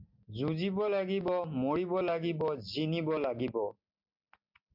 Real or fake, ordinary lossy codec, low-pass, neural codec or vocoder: real; MP3, 48 kbps; 5.4 kHz; none